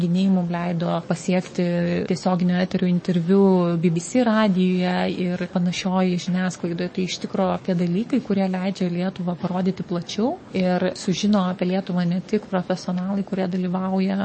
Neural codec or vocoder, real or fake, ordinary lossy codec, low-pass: codec, 44.1 kHz, 7.8 kbps, DAC; fake; MP3, 32 kbps; 9.9 kHz